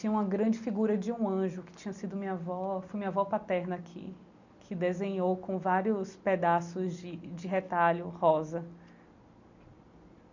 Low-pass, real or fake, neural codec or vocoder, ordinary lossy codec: 7.2 kHz; real; none; none